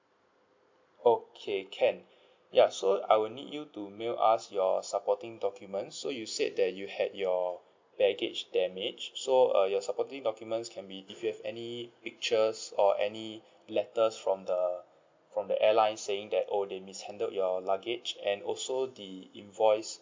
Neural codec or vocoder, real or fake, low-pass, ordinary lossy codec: none; real; 7.2 kHz; MP3, 64 kbps